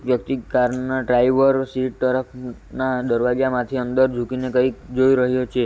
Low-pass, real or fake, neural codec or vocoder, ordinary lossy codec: none; real; none; none